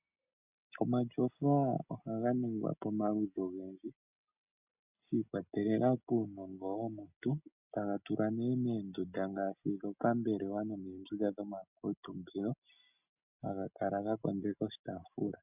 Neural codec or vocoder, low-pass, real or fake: none; 3.6 kHz; real